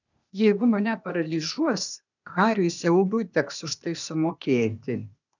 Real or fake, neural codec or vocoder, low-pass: fake; codec, 16 kHz, 0.8 kbps, ZipCodec; 7.2 kHz